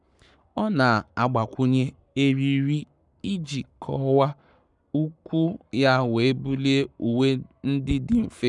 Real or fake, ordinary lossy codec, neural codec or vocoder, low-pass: fake; none; codec, 44.1 kHz, 7.8 kbps, Pupu-Codec; 10.8 kHz